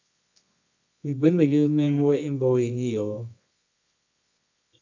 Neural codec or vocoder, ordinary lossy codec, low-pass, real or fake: codec, 24 kHz, 0.9 kbps, WavTokenizer, medium music audio release; AAC, 48 kbps; 7.2 kHz; fake